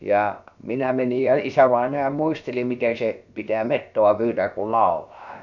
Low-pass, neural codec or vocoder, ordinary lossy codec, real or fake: 7.2 kHz; codec, 16 kHz, about 1 kbps, DyCAST, with the encoder's durations; none; fake